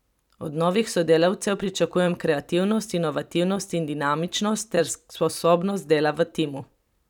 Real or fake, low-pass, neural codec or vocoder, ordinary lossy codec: fake; 19.8 kHz; vocoder, 44.1 kHz, 128 mel bands every 512 samples, BigVGAN v2; none